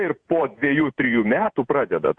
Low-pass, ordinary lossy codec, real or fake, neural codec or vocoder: 9.9 kHz; Opus, 64 kbps; fake; vocoder, 48 kHz, 128 mel bands, Vocos